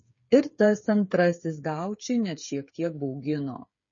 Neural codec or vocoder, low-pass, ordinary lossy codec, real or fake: codec, 16 kHz, 8 kbps, FreqCodec, smaller model; 7.2 kHz; MP3, 32 kbps; fake